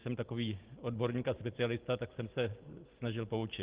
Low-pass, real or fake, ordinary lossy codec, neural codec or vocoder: 3.6 kHz; real; Opus, 16 kbps; none